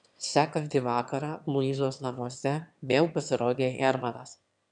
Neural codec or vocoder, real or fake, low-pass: autoencoder, 22.05 kHz, a latent of 192 numbers a frame, VITS, trained on one speaker; fake; 9.9 kHz